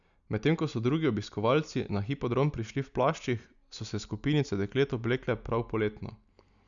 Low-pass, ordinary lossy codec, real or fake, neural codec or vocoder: 7.2 kHz; none; real; none